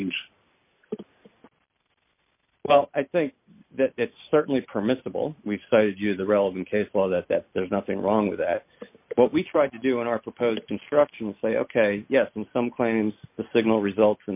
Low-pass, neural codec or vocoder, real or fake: 3.6 kHz; none; real